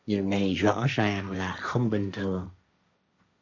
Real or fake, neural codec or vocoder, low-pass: fake; codec, 16 kHz, 1.1 kbps, Voila-Tokenizer; 7.2 kHz